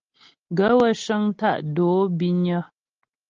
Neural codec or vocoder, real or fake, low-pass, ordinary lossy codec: none; real; 7.2 kHz; Opus, 32 kbps